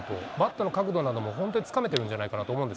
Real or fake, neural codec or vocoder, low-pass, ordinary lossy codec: real; none; none; none